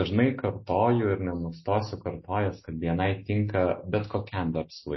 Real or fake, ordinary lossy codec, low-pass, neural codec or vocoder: real; MP3, 24 kbps; 7.2 kHz; none